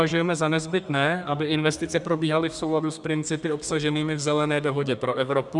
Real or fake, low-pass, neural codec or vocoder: fake; 10.8 kHz; codec, 32 kHz, 1.9 kbps, SNAC